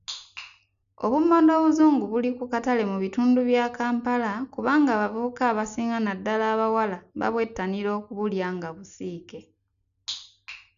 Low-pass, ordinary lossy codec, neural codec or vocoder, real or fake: 7.2 kHz; none; none; real